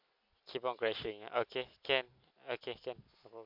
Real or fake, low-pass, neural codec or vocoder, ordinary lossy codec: real; 5.4 kHz; none; MP3, 48 kbps